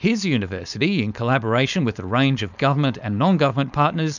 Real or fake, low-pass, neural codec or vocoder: fake; 7.2 kHz; codec, 16 kHz, 4.8 kbps, FACodec